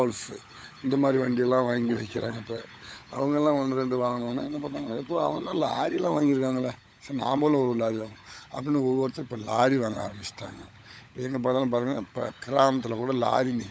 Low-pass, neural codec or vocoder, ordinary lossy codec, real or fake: none; codec, 16 kHz, 16 kbps, FunCodec, trained on LibriTTS, 50 frames a second; none; fake